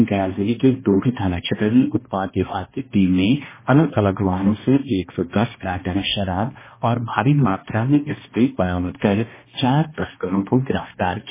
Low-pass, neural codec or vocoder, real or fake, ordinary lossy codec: 3.6 kHz; codec, 16 kHz, 1 kbps, X-Codec, HuBERT features, trained on balanced general audio; fake; MP3, 16 kbps